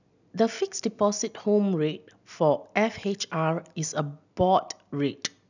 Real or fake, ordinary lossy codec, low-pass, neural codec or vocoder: real; none; 7.2 kHz; none